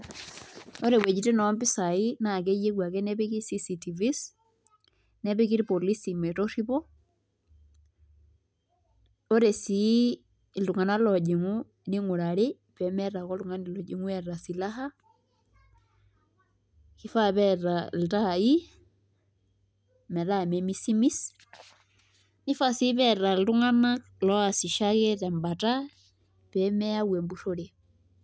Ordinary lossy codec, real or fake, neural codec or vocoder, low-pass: none; real; none; none